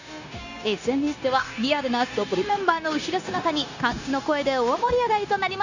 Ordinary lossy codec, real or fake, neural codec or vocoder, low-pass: none; fake; codec, 16 kHz, 0.9 kbps, LongCat-Audio-Codec; 7.2 kHz